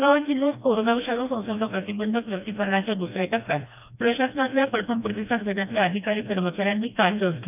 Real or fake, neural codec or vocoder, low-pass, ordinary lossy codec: fake; codec, 16 kHz, 1 kbps, FreqCodec, smaller model; 3.6 kHz; none